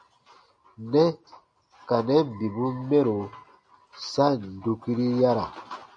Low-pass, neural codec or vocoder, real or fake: 9.9 kHz; none; real